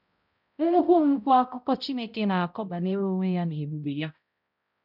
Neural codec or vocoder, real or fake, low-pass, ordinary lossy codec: codec, 16 kHz, 0.5 kbps, X-Codec, HuBERT features, trained on balanced general audio; fake; 5.4 kHz; none